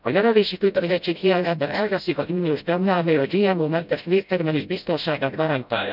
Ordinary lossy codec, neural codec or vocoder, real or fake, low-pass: none; codec, 16 kHz, 0.5 kbps, FreqCodec, smaller model; fake; 5.4 kHz